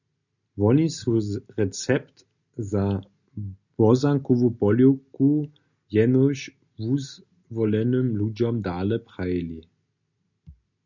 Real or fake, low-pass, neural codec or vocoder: real; 7.2 kHz; none